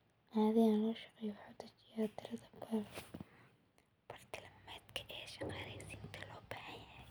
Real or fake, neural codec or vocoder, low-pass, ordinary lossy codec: real; none; none; none